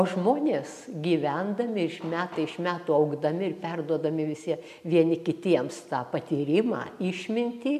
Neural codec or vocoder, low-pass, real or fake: none; 14.4 kHz; real